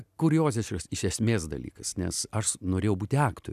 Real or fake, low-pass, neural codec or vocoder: real; 14.4 kHz; none